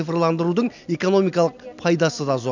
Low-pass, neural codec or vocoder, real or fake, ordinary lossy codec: 7.2 kHz; none; real; none